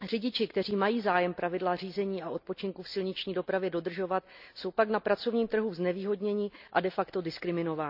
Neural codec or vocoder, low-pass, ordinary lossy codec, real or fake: none; 5.4 kHz; none; real